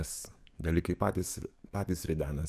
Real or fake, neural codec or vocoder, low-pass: fake; codec, 44.1 kHz, 7.8 kbps, DAC; 14.4 kHz